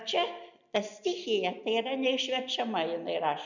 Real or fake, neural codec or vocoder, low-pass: real; none; 7.2 kHz